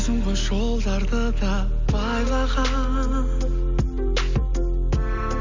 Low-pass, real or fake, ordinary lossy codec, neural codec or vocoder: 7.2 kHz; real; MP3, 64 kbps; none